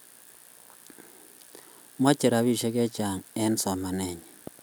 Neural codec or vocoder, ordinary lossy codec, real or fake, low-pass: none; none; real; none